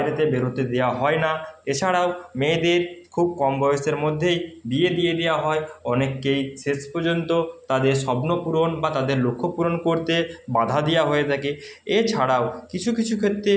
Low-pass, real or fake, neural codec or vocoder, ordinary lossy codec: none; real; none; none